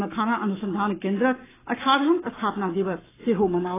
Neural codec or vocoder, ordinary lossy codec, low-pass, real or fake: codec, 44.1 kHz, 7.8 kbps, Pupu-Codec; AAC, 16 kbps; 3.6 kHz; fake